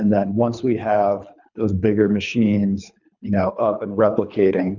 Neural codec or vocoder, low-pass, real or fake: codec, 24 kHz, 3 kbps, HILCodec; 7.2 kHz; fake